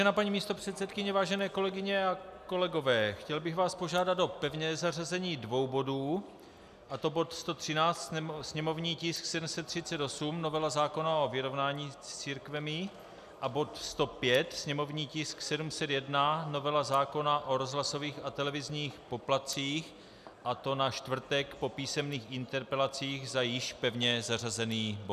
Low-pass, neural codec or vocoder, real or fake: 14.4 kHz; none; real